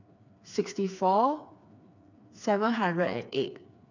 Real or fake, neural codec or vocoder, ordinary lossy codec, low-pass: fake; codec, 16 kHz, 4 kbps, FreqCodec, smaller model; none; 7.2 kHz